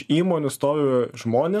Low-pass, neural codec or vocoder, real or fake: 14.4 kHz; none; real